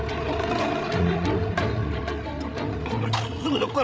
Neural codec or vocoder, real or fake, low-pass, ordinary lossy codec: codec, 16 kHz, 16 kbps, FreqCodec, larger model; fake; none; none